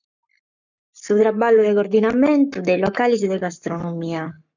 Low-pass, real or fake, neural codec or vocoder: 7.2 kHz; fake; codec, 44.1 kHz, 7.8 kbps, Pupu-Codec